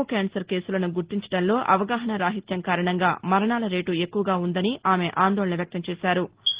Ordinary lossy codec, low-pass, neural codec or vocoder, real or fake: Opus, 16 kbps; 3.6 kHz; none; real